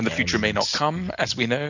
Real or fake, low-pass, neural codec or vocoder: real; 7.2 kHz; none